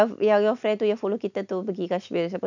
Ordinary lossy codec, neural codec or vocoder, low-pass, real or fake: none; none; 7.2 kHz; real